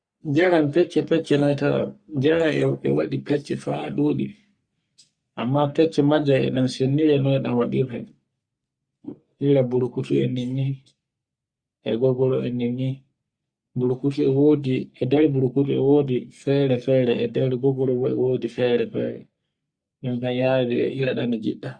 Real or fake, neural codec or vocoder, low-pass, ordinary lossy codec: fake; codec, 44.1 kHz, 3.4 kbps, Pupu-Codec; 9.9 kHz; Opus, 64 kbps